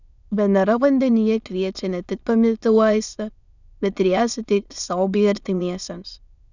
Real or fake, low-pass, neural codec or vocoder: fake; 7.2 kHz; autoencoder, 22.05 kHz, a latent of 192 numbers a frame, VITS, trained on many speakers